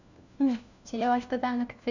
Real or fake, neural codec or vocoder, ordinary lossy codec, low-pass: fake; codec, 16 kHz, 1 kbps, FunCodec, trained on LibriTTS, 50 frames a second; none; 7.2 kHz